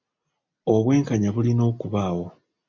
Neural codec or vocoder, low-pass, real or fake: none; 7.2 kHz; real